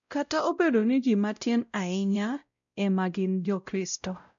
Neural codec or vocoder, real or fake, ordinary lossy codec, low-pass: codec, 16 kHz, 0.5 kbps, X-Codec, WavLM features, trained on Multilingual LibriSpeech; fake; none; 7.2 kHz